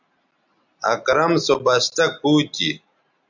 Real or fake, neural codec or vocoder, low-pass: real; none; 7.2 kHz